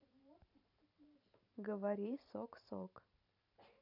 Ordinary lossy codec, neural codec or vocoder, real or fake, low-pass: none; vocoder, 44.1 kHz, 128 mel bands every 512 samples, BigVGAN v2; fake; 5.4 kHz